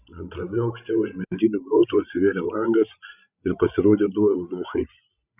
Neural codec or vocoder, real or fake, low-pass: codec, 16 kHz, 16 kbps, FreqCodec, larger model; fake; 3.6 kHz